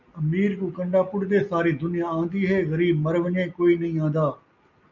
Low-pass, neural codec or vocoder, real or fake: 7.2 kHz; none; real